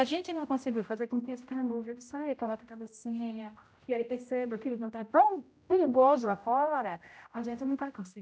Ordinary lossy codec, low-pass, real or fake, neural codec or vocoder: none; none; fake; codec, 16 kHz, 0.5 kbps, X-Codec, HuBERT features, trained on general audio